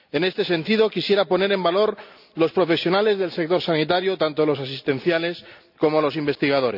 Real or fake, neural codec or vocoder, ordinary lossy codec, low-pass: real; none; none; 5.4 kHz